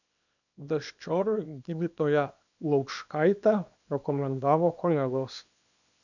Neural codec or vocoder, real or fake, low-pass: codec, 24 kHz, 0.9 kbps, WavTokenizer, small release; fake; 7.2 kHz